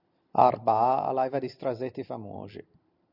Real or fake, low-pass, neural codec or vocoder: real; 5.4 kHz; none